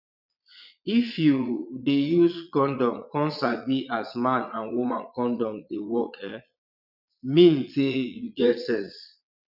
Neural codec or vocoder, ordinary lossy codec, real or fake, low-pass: vocoder, 22.05 kHz, 80 mel bands, Vocos; none; fake; 5.4 kHz